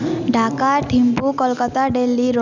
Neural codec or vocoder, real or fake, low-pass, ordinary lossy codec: none; real; 7.2 kHz; none